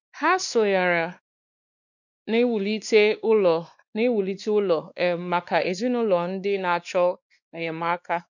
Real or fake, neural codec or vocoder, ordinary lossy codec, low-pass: fake; codec, 16 kHz, 2 kbps, X-Codec, WavLM features, trained on Multilingual LibriSpeech; none; 7.2 kHz